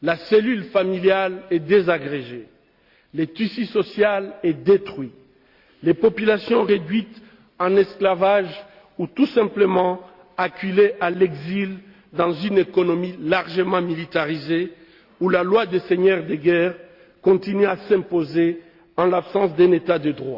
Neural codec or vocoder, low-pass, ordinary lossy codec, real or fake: none; 5.4 kHz; Opus, 64 kbps; real